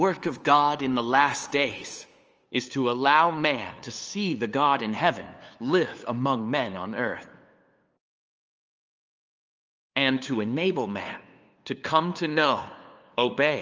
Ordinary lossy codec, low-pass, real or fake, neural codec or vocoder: Opus, 24 kbps; 7.2 kHz; fake; codec, 16 kHz, 2 kbps, FunCodec, trained on LibriTTS, 25 frames a second